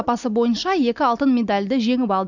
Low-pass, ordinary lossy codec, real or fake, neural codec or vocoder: 7.2 kHz; none; real; none